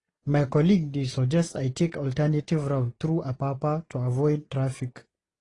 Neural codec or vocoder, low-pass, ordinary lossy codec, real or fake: none; 10.8 kHz; AAC, 32 kbps; real